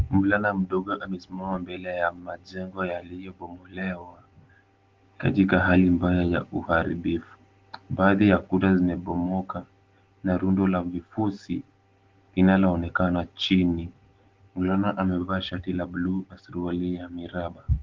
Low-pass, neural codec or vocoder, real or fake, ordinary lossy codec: 7.2 kHz; none; real; Opus, 16 kbps